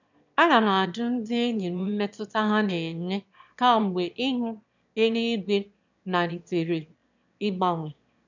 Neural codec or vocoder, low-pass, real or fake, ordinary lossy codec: autoencoder, 22.05 kHz, a latent of 192 numbers a frame, VITS, trained on one speaker; 7.2 kHz; fake; none